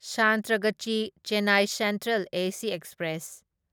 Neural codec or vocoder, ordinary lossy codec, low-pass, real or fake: none; none; none; real